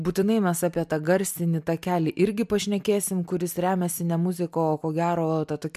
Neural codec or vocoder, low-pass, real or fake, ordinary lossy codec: none; 14.4 kHz; real; MP3, 96 kbps